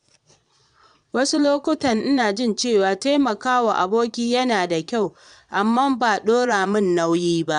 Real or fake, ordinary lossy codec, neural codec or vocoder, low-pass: fake; none; vocoder, 22.05 kHz, 80 mel bands, Vocos; 9.9 kHz